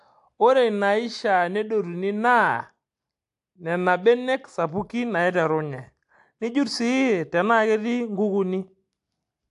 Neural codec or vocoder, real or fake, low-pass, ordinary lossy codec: none; real; 10.8 kHz; AAC, 64 kbps